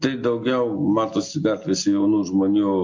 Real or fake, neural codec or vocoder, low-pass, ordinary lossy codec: real; none; 7.2 kHz; MP3, 48 kbps